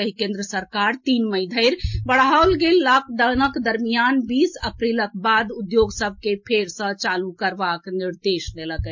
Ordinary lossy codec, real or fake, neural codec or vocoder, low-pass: MP3, 48 kbps; real; none; 7.2 kHz